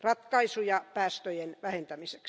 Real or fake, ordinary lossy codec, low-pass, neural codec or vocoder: real; none; none; none